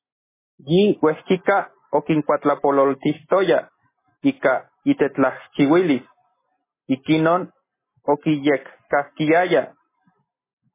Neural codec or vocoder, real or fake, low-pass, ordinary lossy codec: none; real; 3.6 kHz; MP3, 16 kbps